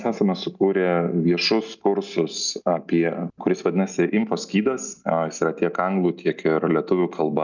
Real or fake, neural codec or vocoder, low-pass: real; none; 7.2 kHz